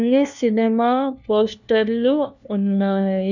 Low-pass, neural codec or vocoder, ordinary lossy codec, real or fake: 7.2 kHz; codec, 16 kHz, 1 kbps, FunCodec, trained on LibriTTS, 50 frames a second; none; fake